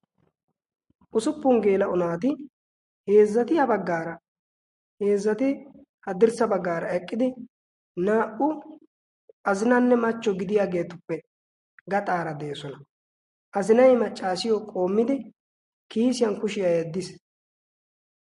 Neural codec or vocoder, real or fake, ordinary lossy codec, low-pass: none; real; MP3, 48 kbps; 14.4 kHz